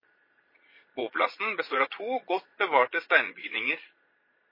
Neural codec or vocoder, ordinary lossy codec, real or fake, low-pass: vocoder, 22.05 kHz, 80 mel bands, Vocos; MP3, 24 kbps; fake; 5.4 kHz